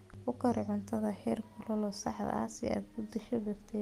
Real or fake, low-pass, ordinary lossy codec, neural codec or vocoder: real; 14.4 kHz; Opus, 32 kbps; none